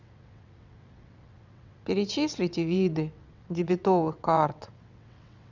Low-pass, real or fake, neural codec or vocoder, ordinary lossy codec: 7.2 kHz; fake; vocoder, 22.05 kHz, 80 mel bands, WaveNeXt; none